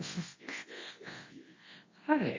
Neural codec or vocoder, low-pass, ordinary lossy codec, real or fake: codec, 24 kHz, 0.9 kbps, WavTokenizer, large speech release; 7.2 kHz; MP3, 32 kbps; fake